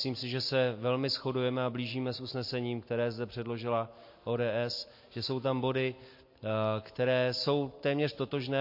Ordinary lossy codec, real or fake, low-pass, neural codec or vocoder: MP3, 32 kbps; real; 5.4 kHz; none